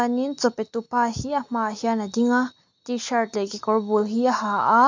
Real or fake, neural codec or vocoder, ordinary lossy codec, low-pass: real; none; MP3, 48 kbps; 7.2 kHz